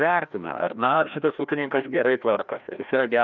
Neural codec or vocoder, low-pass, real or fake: codec, 16 kHz, 1 kbps, FreqCodec, larger model; 7.2 kHz; fake